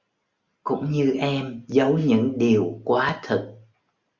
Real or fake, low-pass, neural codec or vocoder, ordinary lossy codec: real; 7.2 kHz; none; Opus, 64 kbps